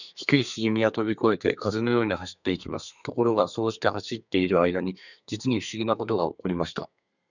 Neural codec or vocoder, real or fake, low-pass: codec, 32 kHz, 1.9 kbps, SNAC; fake; 7.2 kHz